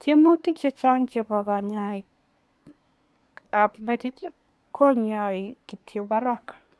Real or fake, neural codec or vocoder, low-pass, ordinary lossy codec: fake; codec, 24 kHz, 1 kbps, SNAC; none; none